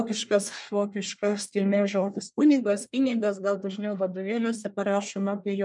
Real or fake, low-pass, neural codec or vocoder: fake; 10.8 kHz; codec, 24 kHz, 1 kbps, SNAC